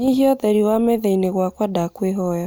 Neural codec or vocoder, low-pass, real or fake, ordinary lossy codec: none; none; real; none